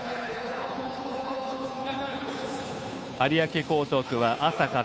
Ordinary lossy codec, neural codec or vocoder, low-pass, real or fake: none; codec, 16 kHz, 2 kbps, FunCodec, trained on Chinese and English, 25 frames a second; none; fake